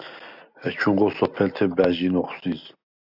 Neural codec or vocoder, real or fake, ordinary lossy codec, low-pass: none; real; AAC, 48 kbps; 5.4 kHz